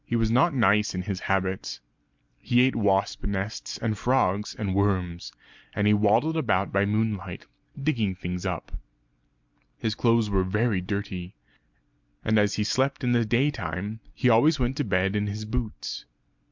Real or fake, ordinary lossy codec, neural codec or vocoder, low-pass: real; MP3, 64 kbps; none; 7.2 kHz